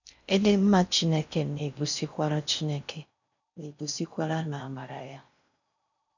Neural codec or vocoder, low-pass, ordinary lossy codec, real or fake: codec, 16 kHz in and 24 kHz out, 0.6 kbps, FocalCodec, streaming, 4096 codes; 7.2 kHz; none; fake